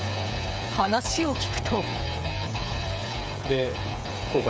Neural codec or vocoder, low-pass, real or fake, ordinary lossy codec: codec, 16 kHz, 16 kbps, FreqCodec, smaller model; none; fake; none